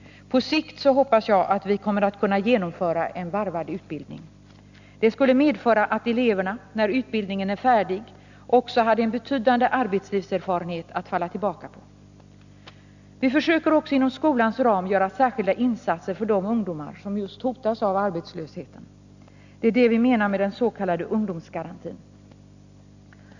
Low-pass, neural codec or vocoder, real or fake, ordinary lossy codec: 7.2 kHz; none; real; none